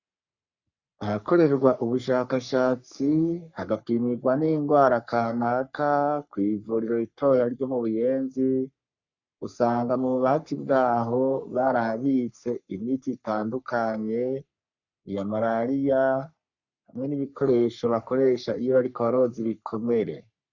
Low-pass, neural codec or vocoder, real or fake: 7.2 kHz; codec, 44.1 kHz, 3.4 kbps, Pupu-Codec; fake